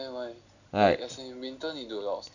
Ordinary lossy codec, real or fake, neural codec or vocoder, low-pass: none; real; none; 7.2 kHz